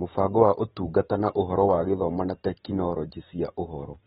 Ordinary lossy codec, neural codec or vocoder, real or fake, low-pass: AAC, 16 kbps; vocoder, 44.1 kHz, 128 mel bands every 512 samples, BigVGAN v2; fake; 19.8 kHz